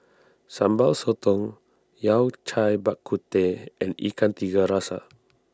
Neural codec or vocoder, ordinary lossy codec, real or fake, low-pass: none; none; real; none